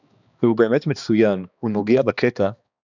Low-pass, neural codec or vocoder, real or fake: 7.2 kHz; codec, 16 kHz, 2 kbps, X-Codec, HuBERT features, trained on balanced general audio; fake